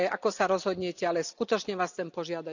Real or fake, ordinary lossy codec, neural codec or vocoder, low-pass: real; none; none; 7.2 kHz